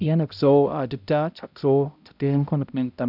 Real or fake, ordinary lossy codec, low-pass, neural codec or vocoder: fake; none; 5.4 kHz; codec, 16 kHz, 0.5 kbps, X-Codec, HuBERT features, trained on balanced general audio